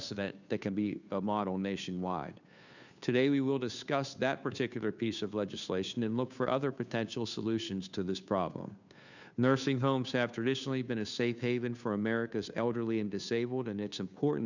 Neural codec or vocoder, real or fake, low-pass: codec, 16 kHz, 2 kbps, FunCodec, trained on Chinese and English, 25 frames a second; fake; 7.2 kHz